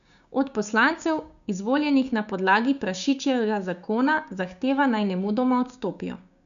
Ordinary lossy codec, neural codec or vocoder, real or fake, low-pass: none; codec, 16 kHz, 6 kbps, DAC; fake; 7.2 kHz